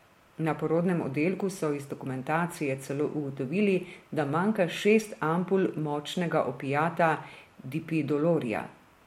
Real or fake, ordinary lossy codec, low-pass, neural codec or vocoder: real; MP3, 64 kbps; 19.8 kHz; none